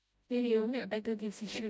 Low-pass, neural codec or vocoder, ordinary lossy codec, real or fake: none; codec, 16 kHz, 0.5 kbps, FreqCodec, smaller model; none; fake